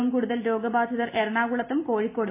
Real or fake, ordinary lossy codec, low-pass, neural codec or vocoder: real; MP3, 24 kbps; 3.6 kHz; none